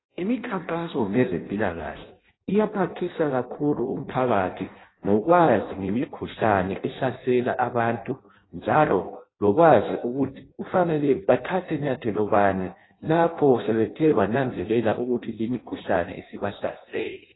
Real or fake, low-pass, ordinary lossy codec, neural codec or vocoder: fake; 7.2 kHz; AAC, 16 kbps; codec, 16 kHz in and 24 kHz out, 0.6 kbps, FireRedTTS-2 codec